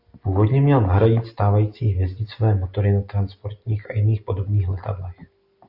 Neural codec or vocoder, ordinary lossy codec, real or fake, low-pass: none; Opus, 64 kbps; real; 5.4 kHz